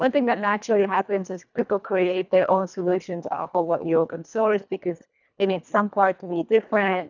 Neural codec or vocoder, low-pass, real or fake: codec, 24 kHz, 1.5 kbps, HILCodec; 7.2 kHz; fake